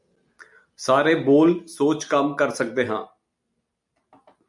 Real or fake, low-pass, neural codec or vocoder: real; 10.8 kHz; none